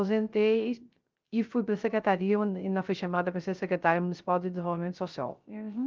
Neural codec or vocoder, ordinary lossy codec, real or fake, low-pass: codec, 16 kHz, 0.3 kbps, FocalCodec; Opus, 32 kbps; fake; 7.2 kHz